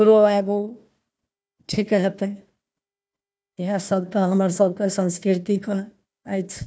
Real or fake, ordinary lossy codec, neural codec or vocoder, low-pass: fake; none; codec, 16 kHz, 1 kbps, FunCodec, trained on Chinese and English, 50 frames a second; none